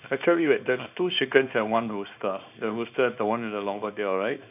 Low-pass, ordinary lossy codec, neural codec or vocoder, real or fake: 3.6 kHz; none; codec, 24 kHz, 0.9 kbps, WavTokenizer, small release; fake